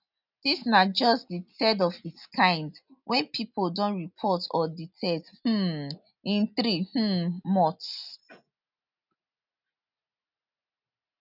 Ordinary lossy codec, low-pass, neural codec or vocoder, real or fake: none; 5.4 kHz; none; real